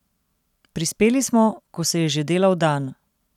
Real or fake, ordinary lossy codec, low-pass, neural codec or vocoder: real; none; 19.8 kHz; none